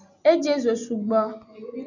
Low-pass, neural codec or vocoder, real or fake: 7.2 kHz; none; real